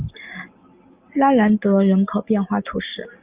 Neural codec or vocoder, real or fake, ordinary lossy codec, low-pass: none; real; Opus, 32 kbps; 3.6 kHz